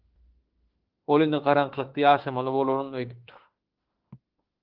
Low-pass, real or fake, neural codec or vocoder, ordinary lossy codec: 5.4 kHz; fake; autoencoder, 48 kHz, 32 numbers a frame, DAC-VAE, trained on Japanese speech; Opus, 16 kbps